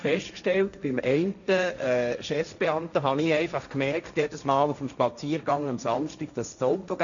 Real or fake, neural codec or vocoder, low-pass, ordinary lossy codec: fake; codec, 16 kHz, 1.1 kbps, Voila-Tokenizer; 7.2 kHz; none